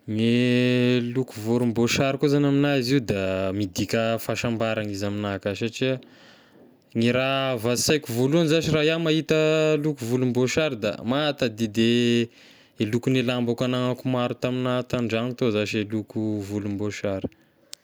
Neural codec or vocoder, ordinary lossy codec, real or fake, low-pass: none; none; real; none